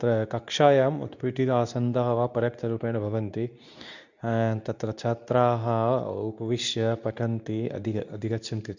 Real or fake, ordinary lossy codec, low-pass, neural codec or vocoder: fake; none; 7.2 kHz; codec, 24 kHz, 0.9 kbps, WavTokenizer, medium speech release version 2